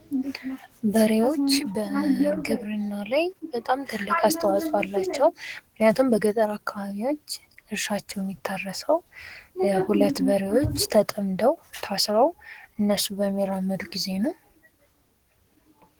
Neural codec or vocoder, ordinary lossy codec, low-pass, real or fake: codec, 44.1 kHz, 7.8 kbps, DAC; Opus, 16 kbps; 19.8 kHz; fake